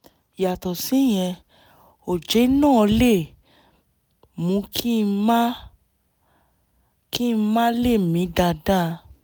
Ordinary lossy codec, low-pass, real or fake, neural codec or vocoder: none; none; real; none